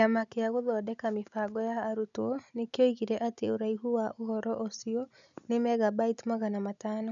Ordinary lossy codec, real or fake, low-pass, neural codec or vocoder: none; real; 7.2 kHz; none